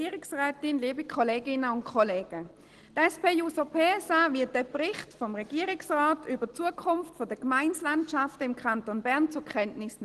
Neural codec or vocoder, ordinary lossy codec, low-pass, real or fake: none; Opus, 16 kbps; 10.8 kHz; real